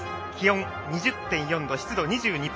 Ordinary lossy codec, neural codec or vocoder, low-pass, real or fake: none; none; none; real